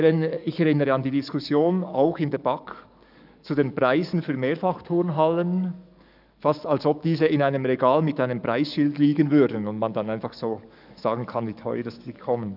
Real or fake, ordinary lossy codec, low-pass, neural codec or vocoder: fake; none; 5.4 kHz; codec, 44.1 kHz, 7.8 kbps, DAC